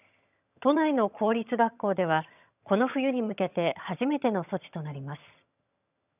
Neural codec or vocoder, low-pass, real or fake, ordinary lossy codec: vocoder, 22.05 kHz, 80 mel bands, HiFi-GAN; 3.6 kHz; fake; none